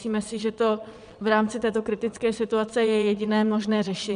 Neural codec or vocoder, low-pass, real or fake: vocoder, 22.05 kHz, 80 mel bands, Vocos; 9.9 kHz; fake